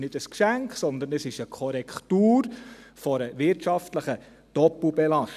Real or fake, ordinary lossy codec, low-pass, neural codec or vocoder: real; none; 14.4 kHz; none